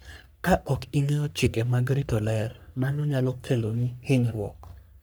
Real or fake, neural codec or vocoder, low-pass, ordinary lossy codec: fake; codec, 44.1 kHz, 3.4 kbps, Pupu-Codec; none; none